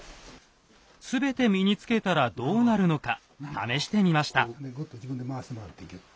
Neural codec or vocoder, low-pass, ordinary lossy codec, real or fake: none; none; none; real